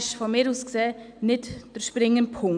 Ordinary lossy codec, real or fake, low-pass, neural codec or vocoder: none; real; 9.9 kHz; none